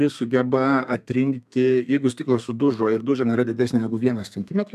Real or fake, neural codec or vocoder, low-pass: fake; codec, 32 kHz, 1.9 kbps, SNAC; 14.4 kHz